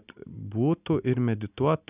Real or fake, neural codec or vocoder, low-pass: real; none; 3.6 kHz